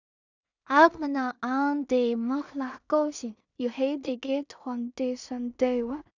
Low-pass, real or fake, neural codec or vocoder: 7.2 kHz; fake; codec, 16 kHz in and 24 kHz out, 0.4 kbps, LongCat-Audio-Codec, two codebook decoder